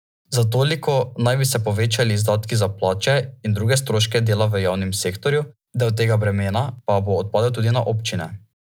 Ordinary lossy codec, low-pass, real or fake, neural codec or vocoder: none; none; real; none